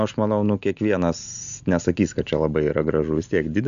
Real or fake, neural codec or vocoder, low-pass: real; none; 7.2 kHz